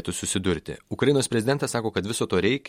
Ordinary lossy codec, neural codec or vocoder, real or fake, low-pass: MP3, 64 kbps; none; real; 19.8 kHz